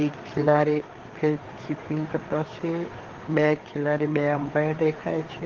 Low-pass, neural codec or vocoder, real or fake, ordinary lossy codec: 7.2 kHz; codec, 16 kHz, 4 kbps, FreqCodec, larger model; fake; Opus, 16 kbps